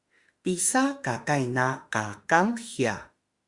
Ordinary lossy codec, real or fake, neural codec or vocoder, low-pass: Opus, 64 kbps; fake; autoencoder, 48 kHz, 32 numbers a frame, DAC-VAE, trained on Japanese speech; 10.8 kHz